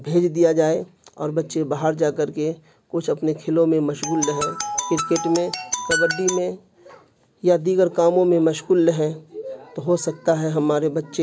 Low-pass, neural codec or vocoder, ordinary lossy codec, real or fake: none; none; none; real